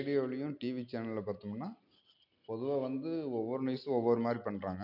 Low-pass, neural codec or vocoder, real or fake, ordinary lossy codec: 5.4 kHz; none; real; none